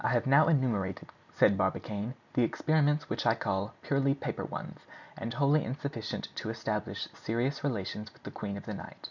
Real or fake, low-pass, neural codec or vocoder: real; 7.2 kHz; none